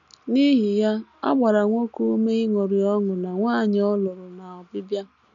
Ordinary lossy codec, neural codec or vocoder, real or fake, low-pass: none; none; real; 7.2 kHz